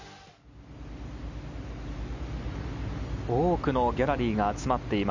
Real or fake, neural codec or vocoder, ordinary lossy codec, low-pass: real; none; none; 7.2 kHz